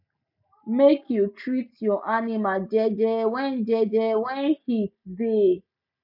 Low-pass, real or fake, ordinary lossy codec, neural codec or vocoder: 5.4 kHz; real; none; none